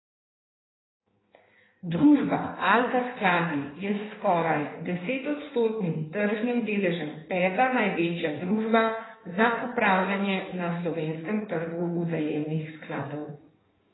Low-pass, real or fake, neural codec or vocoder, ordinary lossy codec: 7.2 kHz; fake; codec, 16 kHz in and 24 kHz out, 1.1 kbps, FireRedTTS-2 codec; AAC, 16 kbps